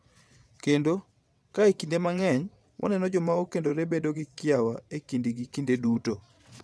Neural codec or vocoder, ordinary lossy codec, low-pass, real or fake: vocoder, 22.05 kHz, 80 mel bands, WaveNeXt; none; none; fake